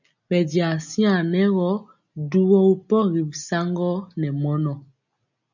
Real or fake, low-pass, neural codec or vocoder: real; 7.2 kHz; none